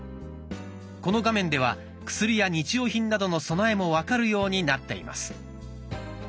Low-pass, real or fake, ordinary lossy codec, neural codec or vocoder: none; real; none; none